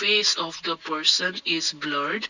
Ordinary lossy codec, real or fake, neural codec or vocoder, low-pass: none; real; none; 7.2 kHz